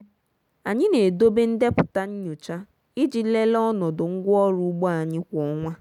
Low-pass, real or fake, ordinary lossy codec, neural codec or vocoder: 19.8 kHz; real; none; none